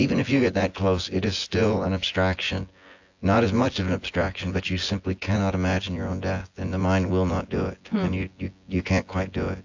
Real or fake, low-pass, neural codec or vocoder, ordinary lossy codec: fake; 7.2 kHz; vocoder, 24 kHz, 100 mel bands, Vocos; AAC, 48 kbps